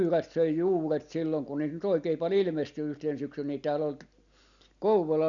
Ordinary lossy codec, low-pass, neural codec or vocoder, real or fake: none; 7.2 kHz; none; real